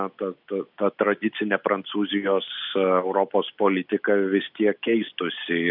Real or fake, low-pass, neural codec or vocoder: real; 5.4 kHz; none